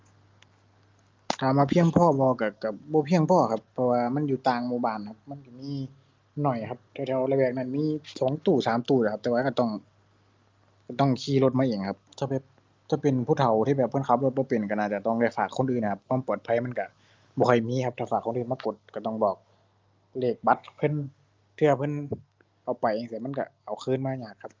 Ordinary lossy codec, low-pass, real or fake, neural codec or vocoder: Opus, 24 kbps; 7.2 kHz; real; none